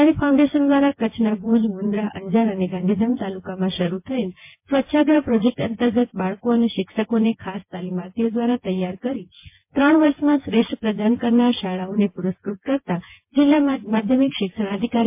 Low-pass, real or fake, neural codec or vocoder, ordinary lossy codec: 3.6 kHz; fake; vocoder, 24 kHz, 100 mel bands, Vocos; MP3, 24 kbps